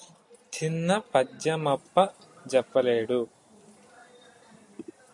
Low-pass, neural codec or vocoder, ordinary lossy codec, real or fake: 10.8 kHz; none; MP3, 64 kbps; real